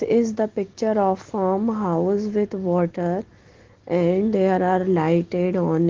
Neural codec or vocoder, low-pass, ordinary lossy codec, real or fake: none; 7.2 kHz; Opus, 16 kbps; real